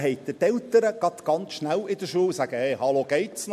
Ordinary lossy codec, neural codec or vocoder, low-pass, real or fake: MP3, 64 kbps; none; 14.4 kHz; real